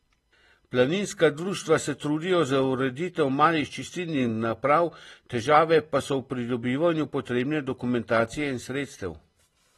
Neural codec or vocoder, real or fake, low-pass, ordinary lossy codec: none; real; 19.8 kHz; AAC, 32 kbps